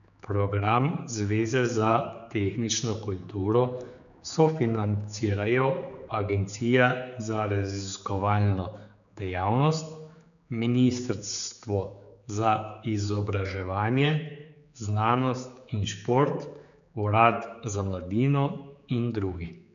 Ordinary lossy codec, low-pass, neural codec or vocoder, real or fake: none; 7.2 kHz; codec, 16 kHz, 4 kbps, X-Codec, HuBERT features, trained on general audio; fake